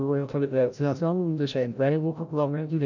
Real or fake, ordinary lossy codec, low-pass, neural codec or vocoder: fake; none; 7.2 kHz; codec, 16 kHz, 0.5 kbps, FreqCodec, larger model